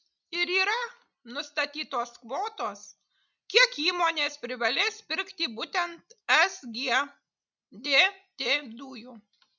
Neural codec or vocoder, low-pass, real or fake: none; 7.2 kHz; real